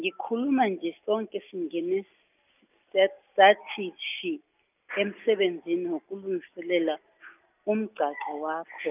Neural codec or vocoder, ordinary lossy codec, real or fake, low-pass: none; none; real; 3.6 kHz